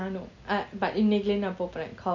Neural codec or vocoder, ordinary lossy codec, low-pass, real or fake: none; none; 7.2 kHz; real